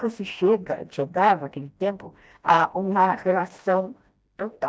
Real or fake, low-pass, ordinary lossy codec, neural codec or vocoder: fake; none; none; codec, 16 kHz, 1 kbps, FreqCodec, smaller model